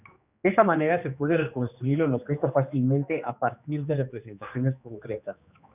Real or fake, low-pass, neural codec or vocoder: fake; 3.6 kHz; codec, 16 kHz, 2 kbps, X-Codec, HuBERT features, trained on general audio